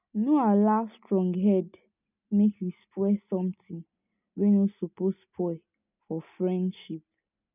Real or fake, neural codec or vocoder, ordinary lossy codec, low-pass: real; none; none; 3.6 kHz